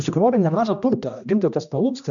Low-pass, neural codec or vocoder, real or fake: 7.2 kHz; codec, 16 kHz, 1 kbps, X-Codec, HuBERT features, trained on general audio; fake